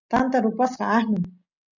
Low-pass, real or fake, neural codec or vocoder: 7.2 kHz; real; none